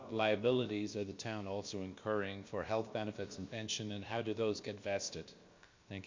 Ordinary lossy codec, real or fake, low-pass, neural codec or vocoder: MP3, 48 kbps; fake; 7.2 kHz; codec, 16 kHz, about 1 kbps, DyCAST, with the encoder's durations